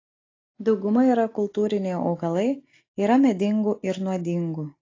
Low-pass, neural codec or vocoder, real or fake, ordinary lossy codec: 7.2 kHz; none; real; AAC, 32 kbps